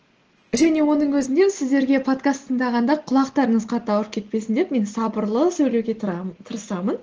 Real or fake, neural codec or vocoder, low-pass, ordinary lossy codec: real; none; 7.2 kHz; Opus, 16 kbps